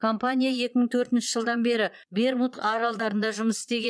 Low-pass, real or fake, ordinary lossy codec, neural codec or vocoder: none; fake; none; vocoder, 22.05 kHz, 80 mel bands, Vocos